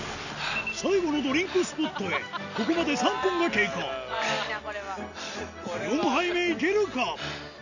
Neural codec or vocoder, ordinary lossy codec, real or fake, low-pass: none; none; real; 7.2 kHz